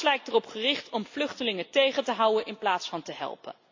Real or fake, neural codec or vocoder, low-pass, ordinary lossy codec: real; none; 7.2 kHz; AAC, 48 kbps